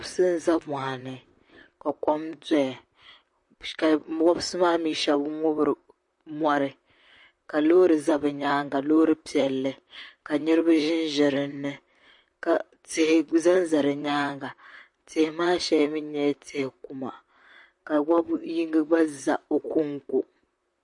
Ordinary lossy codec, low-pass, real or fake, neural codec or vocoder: MP3, 48 kbps; 10.8 kHz; fake; vocoder, 44.1 kHz, 128 mel bands, Pupu-Vocoder